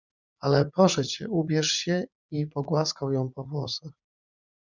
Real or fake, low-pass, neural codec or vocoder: fake; 7.2 kHz; vocoder, 44.1 kHz, 80 mel bands, Vocos